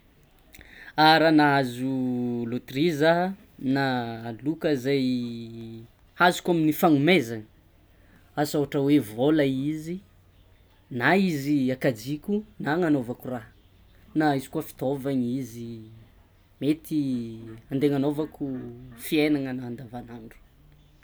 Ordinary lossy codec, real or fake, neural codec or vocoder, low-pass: none; real; none; none